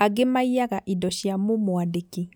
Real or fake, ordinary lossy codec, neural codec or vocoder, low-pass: real; none; none; none